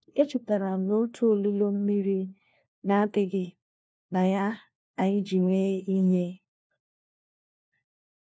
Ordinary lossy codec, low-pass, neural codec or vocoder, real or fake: none; none; codec, 16 kHz, 1 kbps, FunCodec, trained on LibriTTS, 50 frames a second; fake